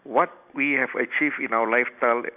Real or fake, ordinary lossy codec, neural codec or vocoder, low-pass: real; none; none; 3.6 kHz